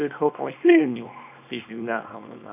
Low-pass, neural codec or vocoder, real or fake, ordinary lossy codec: 3.6 kHz; codec, 24 kHz, 0.9 kbps, WavTokenizer, small release; fake; none